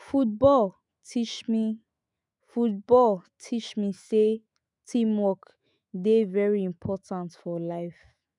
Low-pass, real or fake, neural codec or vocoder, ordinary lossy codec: 10.8 kHz; fake; autoencoder, 48 kHz, 128 numbers a frame, DAC-VAE, trained on Japanese speech; none